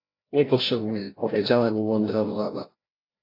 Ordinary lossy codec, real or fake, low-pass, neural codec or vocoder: AAC, 24 kbps; fake; 5.4 kHz; codec, 16 kHz, 0.5 kbps, FreqCodec, larger model